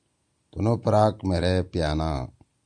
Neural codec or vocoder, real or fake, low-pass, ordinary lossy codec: none; real; 9.9 kHz; Opus, 64 kbps